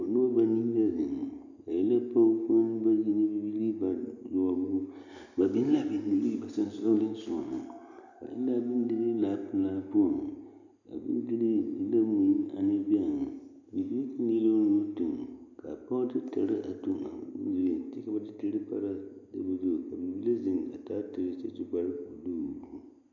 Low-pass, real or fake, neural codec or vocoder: 7.2 kHz; real; none